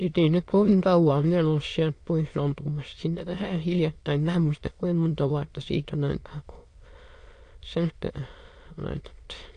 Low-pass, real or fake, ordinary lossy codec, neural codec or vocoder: 9.9 kHz; fake; AAC, 48 kbps; autoencoder, 22.05 kHz, a latent of 192 numbers a frame, VITS, trained on many speakers